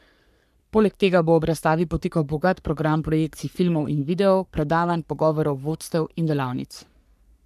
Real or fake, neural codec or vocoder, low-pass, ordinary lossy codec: fake; codec, 44.1 kHz, 3.4 kbps, Pupu-Codec; 14.4 kHz; none